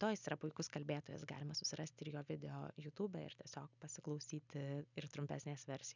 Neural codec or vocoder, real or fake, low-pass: none; real; 7.2 kHz